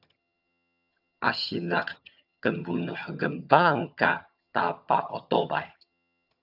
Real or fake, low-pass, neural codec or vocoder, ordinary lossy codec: fake; 5.4 kHz; vocoder, 22.05 kHz, 80 mel bands, HiFi-GAN; AAC, 48 kbps